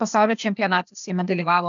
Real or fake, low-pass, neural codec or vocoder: fake; 7.2 kHz; codec, 16 kHz, 0.8 kbps, ZipCodec